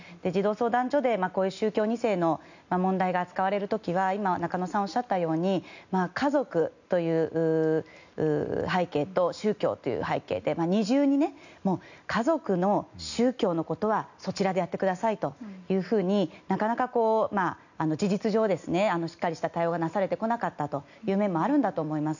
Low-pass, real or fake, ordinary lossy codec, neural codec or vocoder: 7.2 kHz; real; none; none